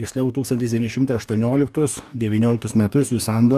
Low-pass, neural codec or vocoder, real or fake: 14.4 kHz; codec, 44.1 kHz, 2.6 kbps, DAC; fake